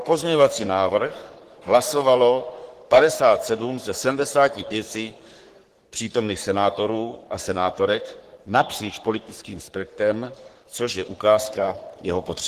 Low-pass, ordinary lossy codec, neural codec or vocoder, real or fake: 14.4 kHz; Opus, 16 kbps; codec, 44.1 kHz, 3.4 kbps, Pupu-Codec; fake